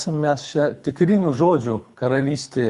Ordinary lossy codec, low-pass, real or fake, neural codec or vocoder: Opus, 64 kbps; 10.8 kHz; fake; codec, 24 kHz, 3 kbps, HILCodec